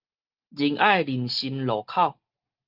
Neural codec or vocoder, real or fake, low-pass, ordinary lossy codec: none; real; 5.4 kHz; Opus, 32 kbps